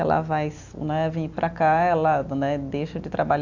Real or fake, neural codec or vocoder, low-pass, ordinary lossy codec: real; none; 7.2 kHz; AAC, 48 kbps